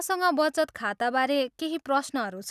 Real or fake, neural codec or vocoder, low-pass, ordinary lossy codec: real; none; 14.4 kHz; none